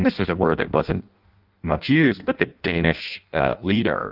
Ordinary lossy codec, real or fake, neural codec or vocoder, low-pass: Opus, 24 kbps; fake; codec, 16 kHz in and 24 kHz out, 0.6 kbps, FireRedTTS-2 codec; 5.4 kHz